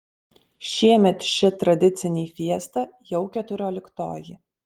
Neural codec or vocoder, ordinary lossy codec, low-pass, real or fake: none; Opus, 24 kbps; 19.8 kHz; real